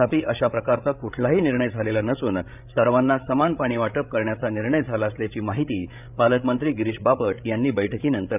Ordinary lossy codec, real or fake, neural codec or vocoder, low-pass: none; fake; codec, 16 kHz, 8 kbps, FreqCodec, larger model; 3.6 kHz